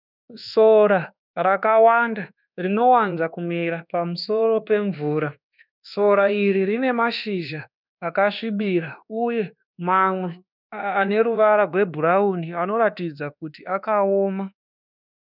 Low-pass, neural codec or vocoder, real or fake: 5.4 kHz; codec, 24 kHz, 1.2 kbps, DualCodec; fake